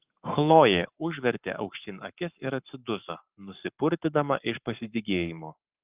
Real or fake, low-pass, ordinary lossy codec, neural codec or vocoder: fake; 3.6 kHz; Opus, 32 kbps; codec, 44.1 kHz, 7.8 kbps, Pupu-Codec